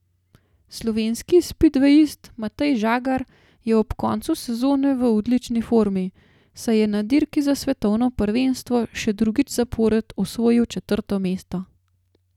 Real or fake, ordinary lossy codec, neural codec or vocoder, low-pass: real; none; none; 19.8 kHz